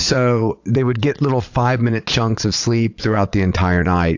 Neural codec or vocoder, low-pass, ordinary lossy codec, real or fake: vocoder, 22.05 kHz, 80 mel bands, Vocos; 7.2 kHz; AAC, 48 kbps; fake